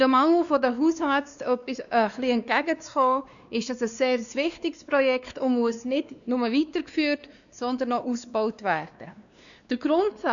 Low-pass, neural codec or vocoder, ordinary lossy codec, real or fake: 7.2 kHz; codec, 16 kHz, 2 kbps, X-Codec, WavLM features, trained on Multilingual LibriSpeech; none; fake